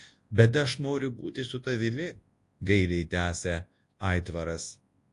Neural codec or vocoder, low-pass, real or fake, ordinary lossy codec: codec, 24 kHz, 0.9 kbps, WavTokenizer, large speech release; 10.8 kHz; fake; AAC, 48 kbps